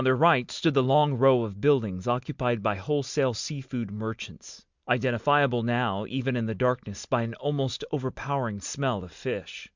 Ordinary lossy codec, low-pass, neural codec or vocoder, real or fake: Opus, 64 kbps; 7.2 kHz; none; real